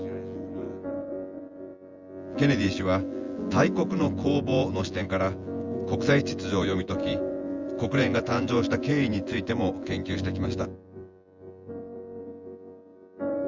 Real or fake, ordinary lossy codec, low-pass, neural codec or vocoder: fake; Opus, 32 kbps; 7.2 kHz; vocoder, 24 kHz, 100 mel bands, Vocos